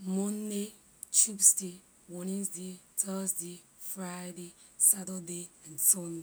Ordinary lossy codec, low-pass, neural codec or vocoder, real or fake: none; none; none; real